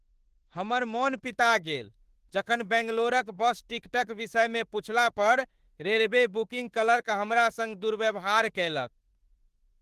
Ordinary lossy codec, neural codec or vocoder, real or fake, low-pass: Opus, 16 kbps; autoencoder, 48 kHz, 32 numbers a frame, DAC-VAE, trained on Japanese speech; fake; 14.4 kHz